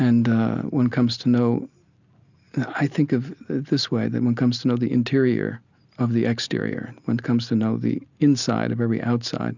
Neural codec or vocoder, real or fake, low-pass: none; real; 7.2 kHz